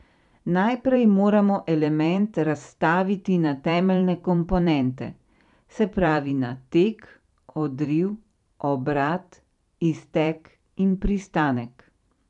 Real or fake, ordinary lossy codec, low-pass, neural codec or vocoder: fake; none; 10.8 kHz; vocoder, 44.1 kHz, 128 mel bands every 512 samples, BigVGAN v2